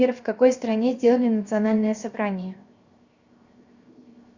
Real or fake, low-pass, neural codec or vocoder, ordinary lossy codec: fake; 7.2 kHz; codec, 16 kHz, 0.7 kbps, FocalCodec; Opus, 64 kbps